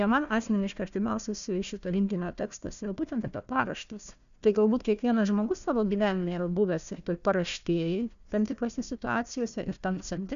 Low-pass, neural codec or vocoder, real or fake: 7.2 kHz; codec, 16 kHz, 1 kbps, FunCodec, trained on Chinese and English, 50 frames a second; fake